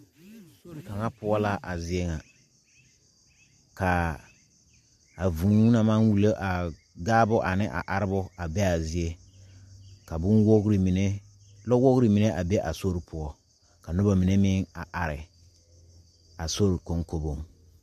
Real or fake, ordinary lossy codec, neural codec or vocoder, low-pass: real; MP3, 64 kbps; none; 14.4 kHz